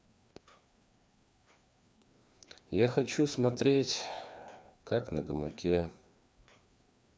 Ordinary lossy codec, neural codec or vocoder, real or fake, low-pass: none; codec, 16 kHz, 2 kbps, FreqCodec, larger model; fake; none